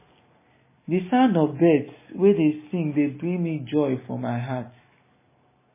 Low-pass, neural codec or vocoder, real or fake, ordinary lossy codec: 3.6 kHz; none; real; MP3, 16 kbps